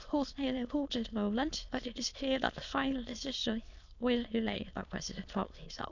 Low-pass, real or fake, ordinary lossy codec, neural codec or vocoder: 7.2 kHz; fake; none; autoencoder, 22.05 kHz, a latent of 192 numbers a frame, VITS, trained on many speakers